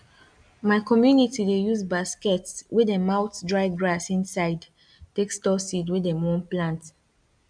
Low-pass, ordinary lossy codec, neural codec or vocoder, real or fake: 9.9 kHz; none; none; real